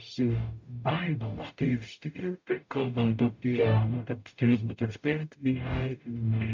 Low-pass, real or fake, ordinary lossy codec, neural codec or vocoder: 7.2 kHz; fake; MP3, 64 kbps; codec, 44.1 kHz, 0.9 kbps, DAC